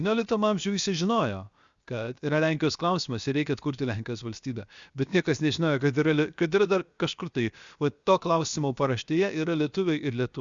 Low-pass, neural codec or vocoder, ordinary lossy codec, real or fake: 7.2 kHz; codec, 16 kHz, 0.7 kbps, FocalCodec; Opus, 64 kbps; fake